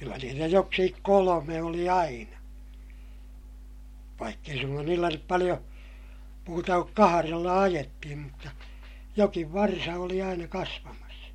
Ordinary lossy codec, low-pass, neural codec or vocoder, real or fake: MP3, 64 kbps; 19.8 kHz; none; real